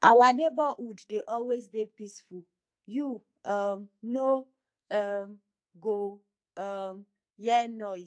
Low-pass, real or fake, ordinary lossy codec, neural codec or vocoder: 9.9 kHz; fake; AAC, 64 kbps; codec, 44.1 kHz, 2.6 kbps, SNAC